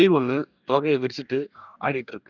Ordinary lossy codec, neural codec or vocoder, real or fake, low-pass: none; codec, 44.1 kHz, 2.6 kbps, DAC; fake; 7.2 kHz